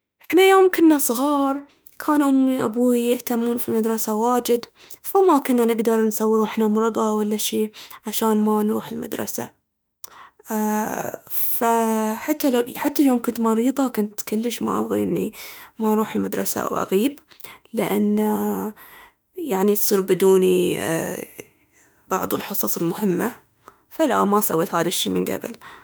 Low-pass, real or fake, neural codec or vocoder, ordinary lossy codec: none; fake; autoencoder, 48 kHz, 32 numbers a frame, DAC-VAE, trained on Japanese speech; none